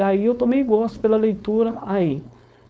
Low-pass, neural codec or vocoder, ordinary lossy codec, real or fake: none; codec, 16 kHz, 4.8 kbps, FACodec; none; fake